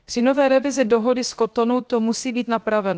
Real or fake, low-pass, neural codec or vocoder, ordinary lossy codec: fake; none; codec, 16 kHz, 0.7 kbps, FocalCodec; none